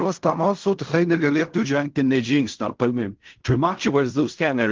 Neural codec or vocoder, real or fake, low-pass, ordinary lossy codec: codec, 16 kHz in and 24 kHz out, 0.4 kbps, LongCat-Audio-Codec, fine tuned four codebook decoder; fake; 7.2 kHz; Opus, 32 kbps